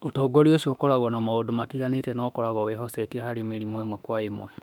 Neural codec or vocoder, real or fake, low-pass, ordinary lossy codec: autoencoder, 48 kHz, 32 numbers a frame, DAC-VAE, trained on Japanese speech; fake; 19.8 kHz; none